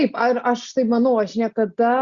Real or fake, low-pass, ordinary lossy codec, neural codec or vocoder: real; 7.2 kHz; Opus, 64 kbps; none